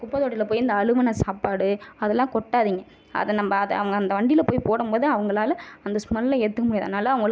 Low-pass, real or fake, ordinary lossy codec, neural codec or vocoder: none; real; none; none